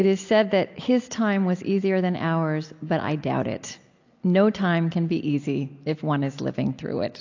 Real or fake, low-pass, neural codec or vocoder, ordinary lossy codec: real; 7.2 kHz; none; AAC, 48 kbps